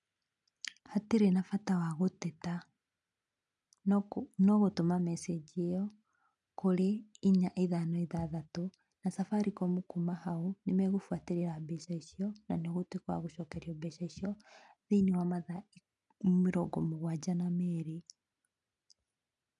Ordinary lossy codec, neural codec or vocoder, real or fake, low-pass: none; none; real; 10.8 kHz